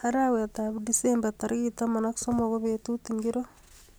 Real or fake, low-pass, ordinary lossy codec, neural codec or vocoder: real; none; none; none